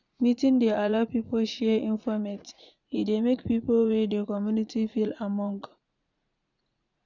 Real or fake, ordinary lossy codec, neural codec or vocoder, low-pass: fake; none; vocoder, 44.1 kHz, 80 mel bands, Vocos; 7.2 kHz